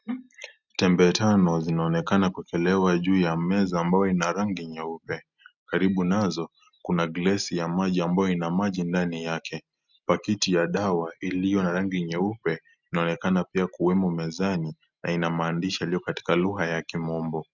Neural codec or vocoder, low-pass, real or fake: none; 7.2 kHz; real